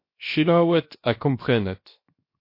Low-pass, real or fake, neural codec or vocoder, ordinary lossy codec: 5.4 kHz; fake; codec, 16 kHz, 0.7 kbps, FocalCodec; MP3, 32 kbps